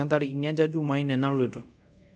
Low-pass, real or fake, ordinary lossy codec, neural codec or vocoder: 9.9 kHz; fake; none; codec, 24 kHz, 0.5 kbps, DualCodec